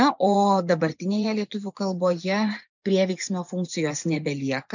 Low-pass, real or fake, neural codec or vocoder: 7.2 kHz; fake; vocoder, 24 kHz, 100 mel bands, Vocos